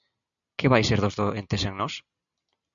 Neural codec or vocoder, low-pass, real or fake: none; 7.2 kHz; real